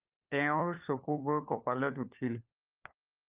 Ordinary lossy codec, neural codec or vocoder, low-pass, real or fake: Opus, 32 kbps; codec, 16 kHz, 4 kbps, FunCodec, trained on LibriTTS, 50 frames a second; 3.6 kHz; fake